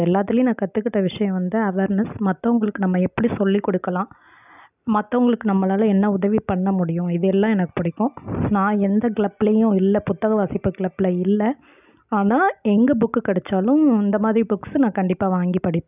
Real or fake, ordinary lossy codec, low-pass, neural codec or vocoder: real; none; 3.6 kHz; none